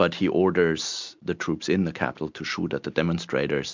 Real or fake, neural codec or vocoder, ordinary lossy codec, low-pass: real; none; MP3, 64 kbps; 7.2 kHz